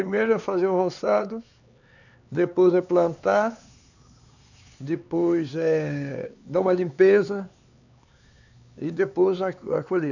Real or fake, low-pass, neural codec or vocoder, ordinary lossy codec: fake; 7.2 kHz; codec, 16 kHz, 4 kbps, X-Codec, HuBERT features, trained on LibriSpeech; none